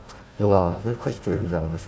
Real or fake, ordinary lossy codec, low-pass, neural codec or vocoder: fake; none; none; codec, 16 kHz, 1 kbps, FunCodec, trained on Chinese and English, 50 frames a second